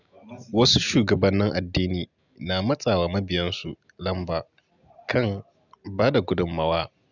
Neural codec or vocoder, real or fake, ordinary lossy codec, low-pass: none; real; none; 7.2 kHz